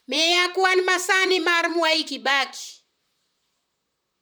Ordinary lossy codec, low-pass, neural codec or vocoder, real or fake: none; none; vocoder, 44.1 kHz, 128 mel bands, Pupu-Vocoder; fake